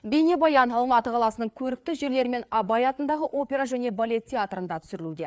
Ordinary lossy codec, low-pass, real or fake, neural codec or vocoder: none; none; fake; codec, 16 kHz, 4 kbps, FreqCodec, larger model